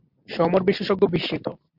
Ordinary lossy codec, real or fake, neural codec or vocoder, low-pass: MP3, 48 kbps; real; none; 5.4 kHz